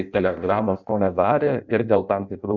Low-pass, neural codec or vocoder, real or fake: 7.2 kHz; codec, 16 kHz in and 24 kHz out, 0.6 kbps, FireRedTTS-2 codec; fake